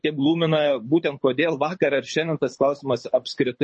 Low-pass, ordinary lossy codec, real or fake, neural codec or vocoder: 9.9 kHz; MP3, 32 kbps; fake; codec, 24 kHz, 6 kbps, HILCodec